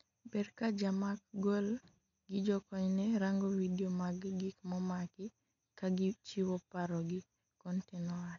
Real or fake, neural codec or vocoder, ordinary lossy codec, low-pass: real; none; none; 7.2 kHz